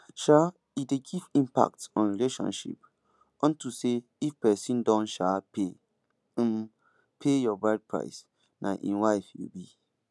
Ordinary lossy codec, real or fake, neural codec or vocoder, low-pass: none; real; none; none